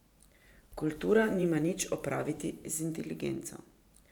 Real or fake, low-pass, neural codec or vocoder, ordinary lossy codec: fake; 19.8 kHz; vocoder, 44.1 kHz, 128 mel bands every 512 samples, BigVGAN v2; none